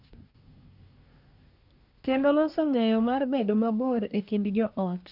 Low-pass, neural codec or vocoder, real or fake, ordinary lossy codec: 5.4 kHz; codec, 24 kHz, 1 kbps, SNAC; fake; none